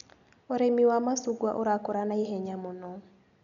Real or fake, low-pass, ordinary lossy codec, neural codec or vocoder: real; 7.2 kHz; none; none